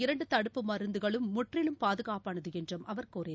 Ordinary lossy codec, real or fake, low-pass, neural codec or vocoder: none; real; none; none